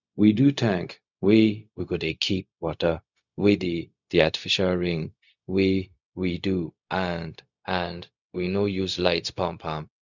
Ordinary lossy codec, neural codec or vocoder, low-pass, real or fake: none; codec, 16 kHz, 0.4 kbps, LongCat-Audio-Codec; 7.2 kHz; fake